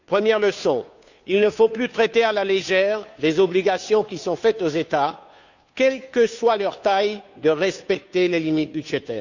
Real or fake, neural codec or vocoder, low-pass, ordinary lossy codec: fake; codec, 16 kHz, 2 kbps, FunCodec, trained on Chinese and English, 25 frames a second; 7.2 kHz; none